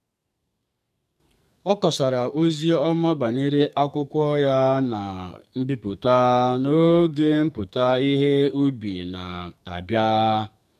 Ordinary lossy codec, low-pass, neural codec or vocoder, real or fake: MP3, 96 kbps; 14.4 kHz; codec, 44.1 kHz, 2.6 kbps, SNAC; fake